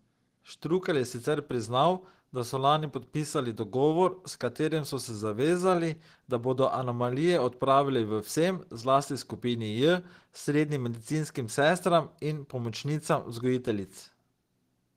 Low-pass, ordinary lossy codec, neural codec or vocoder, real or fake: 14.4 kHz; Opus, 16 kbps; none; real